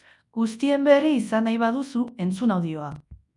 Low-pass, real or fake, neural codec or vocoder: 10.8 kHz; fake; codec, 24 kHz, 0.9 kbps, WavTokenizer, large speech release